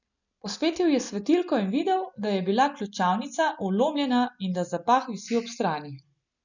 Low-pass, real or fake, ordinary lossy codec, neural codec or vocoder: 7.2 kHz; real; none; none